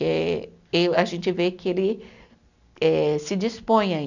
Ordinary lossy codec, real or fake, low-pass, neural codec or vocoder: none; real; 7.2 kHz; none